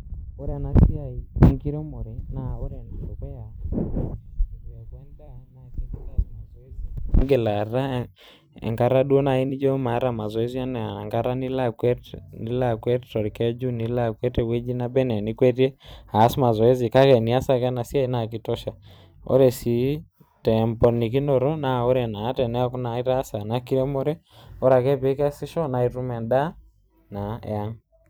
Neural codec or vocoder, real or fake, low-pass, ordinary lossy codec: vocoder, 44.1 kHz, 128 mel bands every 256 samples, BigVGAN v2; fake; none; none